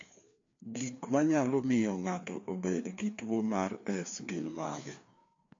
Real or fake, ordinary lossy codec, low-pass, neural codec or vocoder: fake; AAC, 48 kbps; 7.2 kHz; codec, 16 kHz, 2 kbps, FreqCodec, larger model